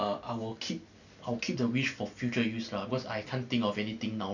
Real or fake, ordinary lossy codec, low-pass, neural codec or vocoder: real; none; 7.2 kHz; none